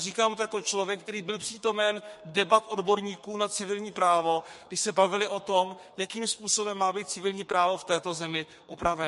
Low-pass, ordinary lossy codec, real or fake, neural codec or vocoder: 14.4 kHz; MP3, 48 kbps; fake; codec, 32 kHz, 1.9 kbps, SNAC